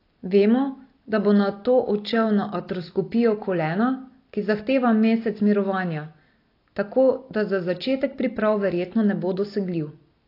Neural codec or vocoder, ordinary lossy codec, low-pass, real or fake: none; AAC, 32 kbps; 5.4 kHz; real